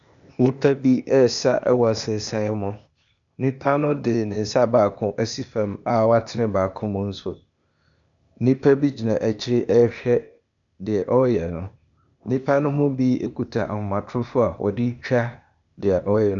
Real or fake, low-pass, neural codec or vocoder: fake; 7.2 kHz; codec, 16 kHz, 0.8 kbps, ZipCodec